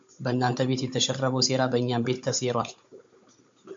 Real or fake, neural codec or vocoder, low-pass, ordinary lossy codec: fake; codec, 16 kHz, 16 kbps, FunCodec, trained on Chinese and English, 50 frames a second; 7.2 kHz; MP3, 48 kbps